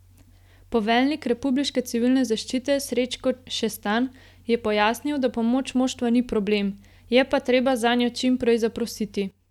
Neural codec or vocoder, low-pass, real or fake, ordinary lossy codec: none; 19.8 kHz; real; none